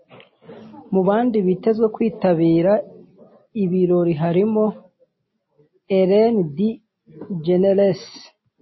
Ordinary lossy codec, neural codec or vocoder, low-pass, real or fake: MP3, 24 kbps; none; 7.2 kHz; real